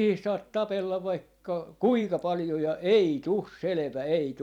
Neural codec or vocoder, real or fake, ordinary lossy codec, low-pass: vocoder, 44.1 kHz, 128 mel bands every 256 samples, BigVGAN v2; fake; none; 19.8 kHz